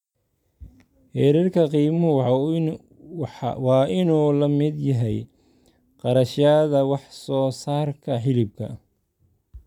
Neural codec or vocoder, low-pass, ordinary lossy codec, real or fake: vocoder, 44.1 kHz, 128 mel bands every 256 samples, BigVGAN v2; 19.8 kHz; none; fake